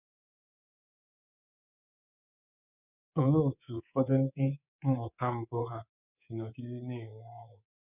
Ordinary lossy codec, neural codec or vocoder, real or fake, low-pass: none; codec, 24 kHz, 3.1 kbps, DualCodec; fake; 3.6 kHz